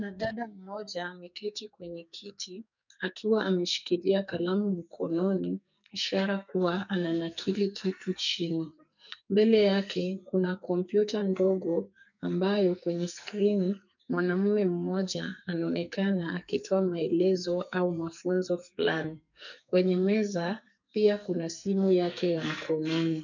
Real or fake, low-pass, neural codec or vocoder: fake; 7.2 kHz; codec, 44.1 kHz, 2.6 kbps, SNAC